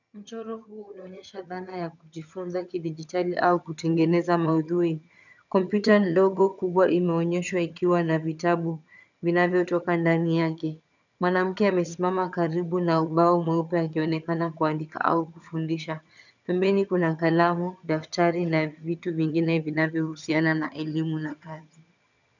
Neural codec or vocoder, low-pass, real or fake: vocoder, 22.05 kHz, 80 mel bands, HiFi-GAN; 7.2 kHz; fake